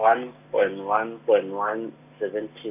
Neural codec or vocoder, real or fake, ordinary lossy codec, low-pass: none; real; none; 3.6 kHz